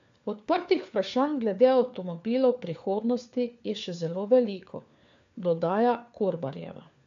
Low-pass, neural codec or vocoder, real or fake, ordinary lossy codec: 7.2 kHz; codec, 16 kHz, 4 kbps, FunCodec, trained on LibriTTS, 50 frames a second; fake; AAC, 64 kbps